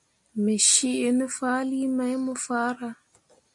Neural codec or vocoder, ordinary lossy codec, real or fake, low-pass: none; MP3, 64 kbps; real; 10.8 kHz